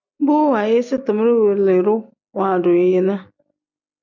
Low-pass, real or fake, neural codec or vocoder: 7.2 kHz; real; none